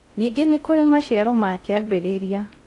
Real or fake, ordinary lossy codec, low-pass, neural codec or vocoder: fake; AAC, 48 kbps; 10.8 kHz; codec, 16 kHz in and 24 kHz out, 0.6 kbps, FocalCodec, streaming, 4096 codes